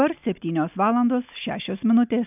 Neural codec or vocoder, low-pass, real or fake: none; 3.6 kHz; real